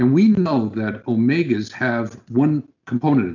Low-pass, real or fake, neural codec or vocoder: 7.2 kHz; real; none